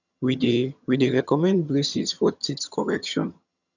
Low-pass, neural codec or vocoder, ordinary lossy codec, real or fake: 7.2 kHz; vocoder, 22.05 kHz, 80 mel bands, HiFi-GAN; none; fake